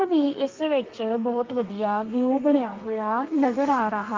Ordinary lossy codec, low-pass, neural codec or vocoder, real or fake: Opus, 32 kbps; 7.2 kHz; codec, 32 kHz, 1.9 kbps, SNAC; fake